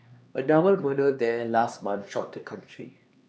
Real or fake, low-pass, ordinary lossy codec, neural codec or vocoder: fake; none; none; codec, 16 kHz, 2 kbps, X-Codec, HuBERT features, trained on LibriSpeech